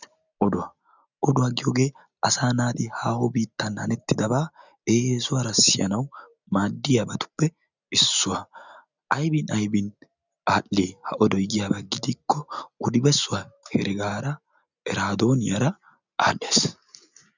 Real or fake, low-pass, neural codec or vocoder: real; 7.2 kHz; none